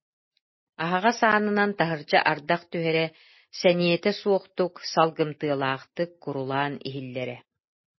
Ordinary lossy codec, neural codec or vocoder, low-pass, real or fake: MP3, 24 kbps; none; 7.2 kHz; real